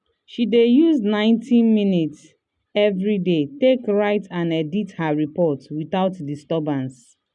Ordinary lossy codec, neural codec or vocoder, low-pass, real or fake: none; none; 10.8 kHz; real